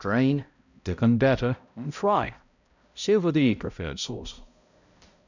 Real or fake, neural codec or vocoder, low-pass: fake; codec, 16 kHz, 0.5 kbps, X-Codec, HuBERT features, trained on balanced general audio; 7.2 kHz